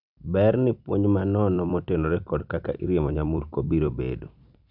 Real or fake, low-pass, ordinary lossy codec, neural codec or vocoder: fake; 5.4 kHz; none; vocoder, 44.1 kHz, 128 mel bands every 256 samples, BigVGAN v2